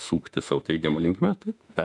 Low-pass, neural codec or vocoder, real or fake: 10.8 kHz; autoencoder, 48 kHz, 32 numbers a frame, DAC-VAE, trained on Japanese speech; fake